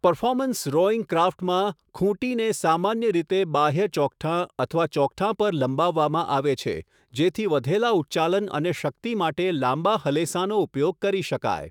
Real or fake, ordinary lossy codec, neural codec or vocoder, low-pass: fake; none; autoencoder, 48 kHz, 128 numbers a frame, DAC-VAE, trained on Japanese speech; 19.8 kHz